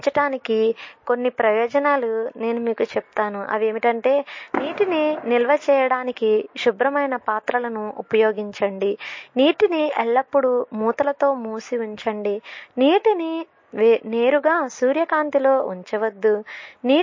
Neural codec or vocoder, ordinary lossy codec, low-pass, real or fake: none; MP3, 32 kbps; 7.2 kHz; real